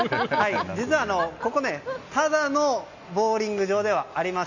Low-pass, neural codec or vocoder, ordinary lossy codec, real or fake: 7.2 kHz; none; none; real